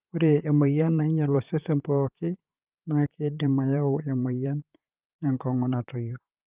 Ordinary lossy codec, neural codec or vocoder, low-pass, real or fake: Opus, 32 kbps; vocoder, 44.1 kHz, 128 mel bands, Pupu-Vocoder; 3.6 kHz; fake